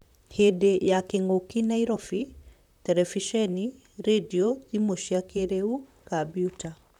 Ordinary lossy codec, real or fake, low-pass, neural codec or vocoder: none; fake; 19.8 kHz; vocoder, 44.1 kHz, 128 mel bands, Pupu-Vocoder